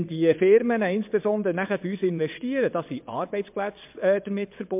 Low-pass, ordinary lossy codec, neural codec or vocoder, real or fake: 3.6 kHz; MP3, 32 kbps; vocoder, 22.05 kHz, 80 mel bands, WaveNeXt; fake